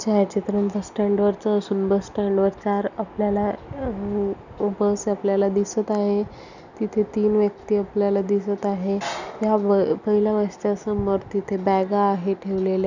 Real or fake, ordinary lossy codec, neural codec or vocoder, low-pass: real; none; none; 7.2 kHz